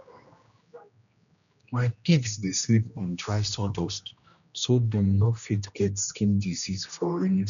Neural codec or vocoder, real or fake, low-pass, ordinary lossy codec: codec, 16 kHz, 1 kbps, X-Codec, HuBERT features, trained on general audio; fake; 7.2 kHz; none